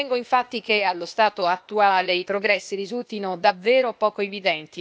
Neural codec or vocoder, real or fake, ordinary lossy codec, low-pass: codec, 16 kHz, 0.8 kbps, ZipCodec; fake; none; none